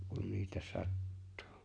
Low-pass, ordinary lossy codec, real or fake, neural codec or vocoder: 9.9 kHz; none; real; none